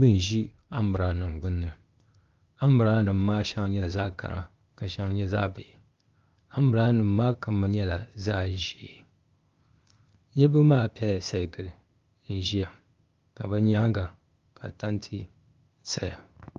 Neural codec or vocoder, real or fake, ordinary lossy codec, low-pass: codec, 16 kHz, 0.8 kbps, ZipCodec; fake; Opus, 32 kbps; 7.2 kHz